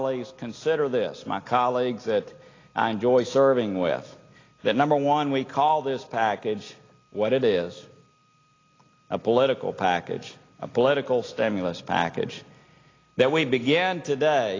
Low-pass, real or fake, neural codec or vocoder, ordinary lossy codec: 7.2 kHz; real; none; AAC, 32 kbps